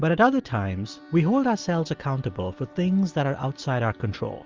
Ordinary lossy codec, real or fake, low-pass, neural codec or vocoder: Opus, 32 kbps; real; 7.2 kHz; none